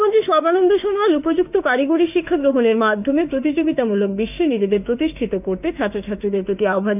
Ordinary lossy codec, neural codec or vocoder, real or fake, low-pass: none; autoencoder, 48 kHz, 32 numbers a frame, DAC-VAE, trained on Japanese speech; fake; 3.6 kHz